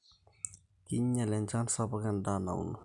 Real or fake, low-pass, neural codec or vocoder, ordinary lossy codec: real; 10.8 kHz; none; none